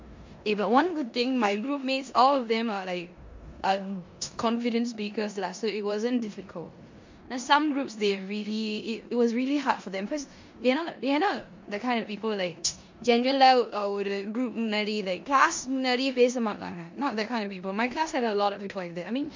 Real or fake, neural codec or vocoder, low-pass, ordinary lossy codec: fake; codec, 16 kHz in and 24 kHz out, 0.9 kbps, LongCat-Audio-Codec, four codebook decoder; 7.2 kHz; MP3, 48 kbps